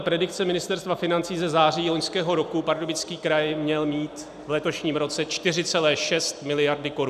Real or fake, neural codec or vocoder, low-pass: real; none; 14.4 kHz